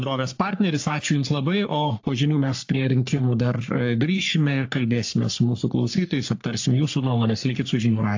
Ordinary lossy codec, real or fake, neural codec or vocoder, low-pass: AAC, 48 kbps; fake; codec, 44.1 kHz, 3.4 kbps, Pupu-Codec; 7.2 kHz